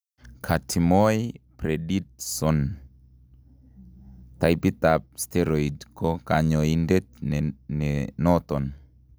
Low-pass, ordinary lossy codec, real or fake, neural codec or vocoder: none; none; real; none